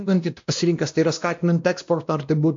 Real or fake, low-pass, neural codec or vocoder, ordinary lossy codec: fake; 7.2 kHz; codec, 16 kHz, 1 kbps, X-Codec, WavLM features, trained on Multilingual LibriSpeech; AAC, 64 kbps